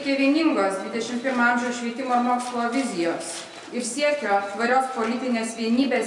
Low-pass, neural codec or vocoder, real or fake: 10.8 kHz; none; real